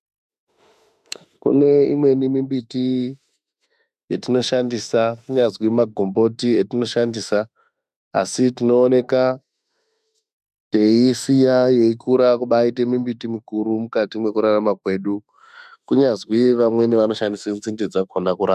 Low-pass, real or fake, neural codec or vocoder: 14.4 kHz; fake; autoencoder, 48 kHz, 32 numbers a frame, DAC-VAE, trained on Japanese speech